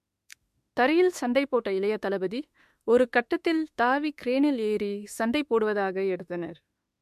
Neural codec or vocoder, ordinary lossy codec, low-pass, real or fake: autoencoder, 48 kHz, 32 numbers a frame, DAC-VAE, trained on Japanese speech; MP3, 64 kbps; 14.4 kHz; fake